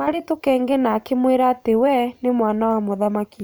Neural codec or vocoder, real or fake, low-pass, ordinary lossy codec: vocoder, 44.1 kHz, 128 mel bands every 512 samples, BigVGAN v2; fake; none; none